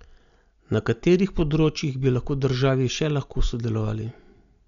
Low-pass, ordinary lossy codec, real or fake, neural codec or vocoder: 7.2 kHz; none; real; none